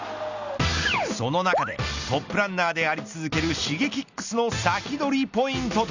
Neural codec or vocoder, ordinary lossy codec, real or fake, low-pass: none; Opus, 64 kbps; real; 7.2 kHz